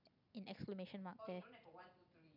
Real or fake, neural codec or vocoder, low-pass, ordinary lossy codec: real; none; 5.4 kHz; none